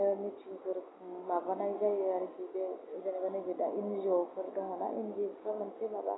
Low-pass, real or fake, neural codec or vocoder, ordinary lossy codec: 7.2 kHz; real; none; AAC, 16 kbps